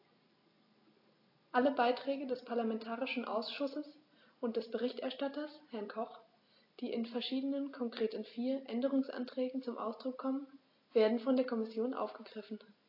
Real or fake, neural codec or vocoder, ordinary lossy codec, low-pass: real; none; AAC, 32 kbps; 5.4 kHz